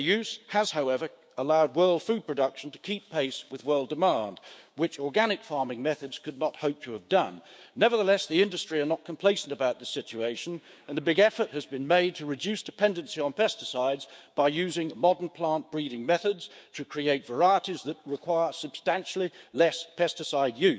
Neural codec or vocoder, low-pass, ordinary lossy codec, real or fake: codec, 16 kHz, 6 kbps, DAC; none; none; fake